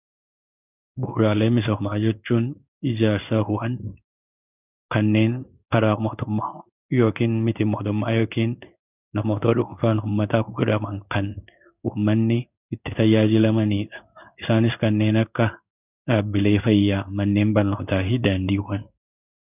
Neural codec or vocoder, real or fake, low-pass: codec, 16 kHz in and 24 kHz out, 1 kbps, XY-Tokenizer; fake; 3.6 kHz